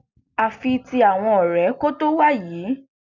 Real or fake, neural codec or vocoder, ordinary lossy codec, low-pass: real; none; none; 7.2 kHz